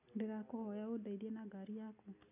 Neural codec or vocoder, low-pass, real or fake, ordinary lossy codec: none; 3.6 kHz; real; none